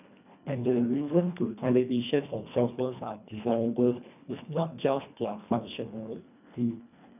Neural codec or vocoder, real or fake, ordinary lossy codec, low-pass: codec, 24 kHz, 1.5 kbps, HILCodec; fake; none; 3.6 kHz